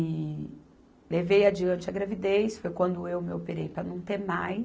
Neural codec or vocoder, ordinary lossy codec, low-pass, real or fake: none; none; none; real